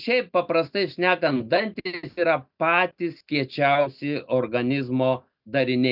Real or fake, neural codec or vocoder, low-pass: real; none; 5.4 kHz